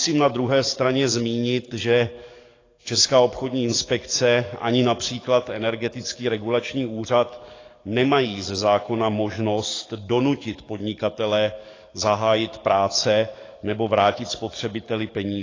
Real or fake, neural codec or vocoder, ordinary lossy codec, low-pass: fake; codec, 44.1 kHz, 7.8 kbps, DAC; AAC, 32 kbps; 7.2 kHz